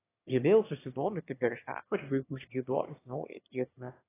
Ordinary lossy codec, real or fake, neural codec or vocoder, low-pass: AAC, 16 kbps; fake; autoencoder, 22.05 kHz, a latent of 192 numbers a frame, VITS, trained on one speaker; 3.6 kHz